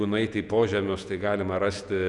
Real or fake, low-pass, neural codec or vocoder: fake; 10.8 kHz; vocoder, 48 kHz, 128 mel bands, Vocos